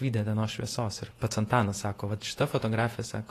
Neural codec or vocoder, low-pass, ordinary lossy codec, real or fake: vocoder, 48 kHz, 128 mel bands, Vocos; 14.4 kHz; AAC, 48 kbps; fake